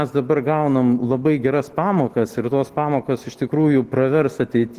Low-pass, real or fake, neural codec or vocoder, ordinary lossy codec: 14.4 kHz; real; none; Opus, 16 kbps